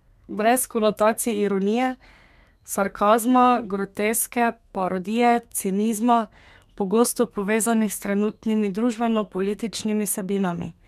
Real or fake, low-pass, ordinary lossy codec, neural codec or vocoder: fake; 14.4 kHz; none; codec, 32 kHz, 1.9 kbps, SNAC